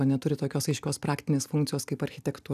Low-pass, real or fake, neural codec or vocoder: 14.4 kHz; real; none